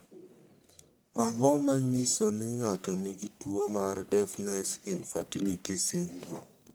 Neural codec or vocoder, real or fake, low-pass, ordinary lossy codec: codec, 44.1 kHz, 1.7 kbps, Pupu-Codec; fake; none; none